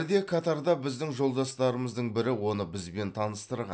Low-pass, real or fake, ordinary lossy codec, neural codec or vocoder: none; real; none; none